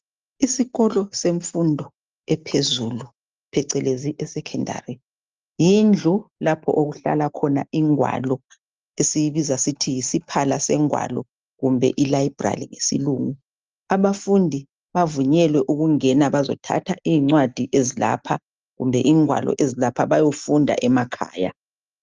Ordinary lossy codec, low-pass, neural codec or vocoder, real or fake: Opus, 24 kbps; 7.2 kHz; none; real